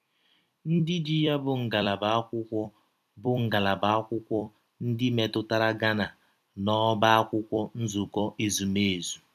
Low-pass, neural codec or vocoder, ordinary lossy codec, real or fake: 14.4 kHz; vocoder, 44.1 kHz, 128 mel bands every 256 samples, BigVGAN v2; none; fake